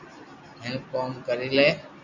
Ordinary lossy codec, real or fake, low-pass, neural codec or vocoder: Opus, 64 kbps; real; 7.2 kHz; none